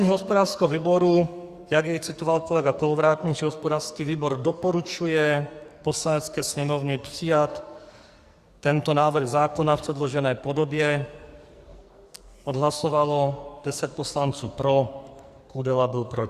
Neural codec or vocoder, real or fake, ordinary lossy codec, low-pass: codec, 44.1 kHz, 2.6 kbps, SNAC; fake; Opus, 64 kbps; 14.4 kHz